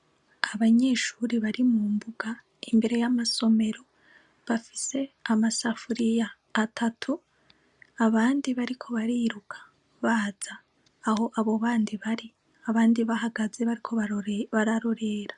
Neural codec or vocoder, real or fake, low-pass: none; real; 10.8 kHz